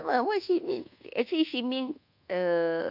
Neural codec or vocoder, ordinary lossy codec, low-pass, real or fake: codec, 24 kHz, 1.2 kbps, DualCodec; none; 5.4 kHz; fake